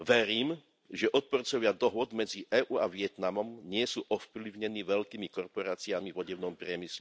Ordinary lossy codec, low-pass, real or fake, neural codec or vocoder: none; none; real; none